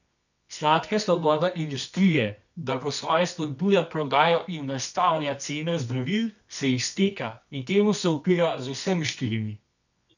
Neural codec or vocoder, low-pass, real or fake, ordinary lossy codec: codec, 24 kHz, 0.9 kbps, WavTokenizer, medium music audio release; 7.2 kHz; fake; none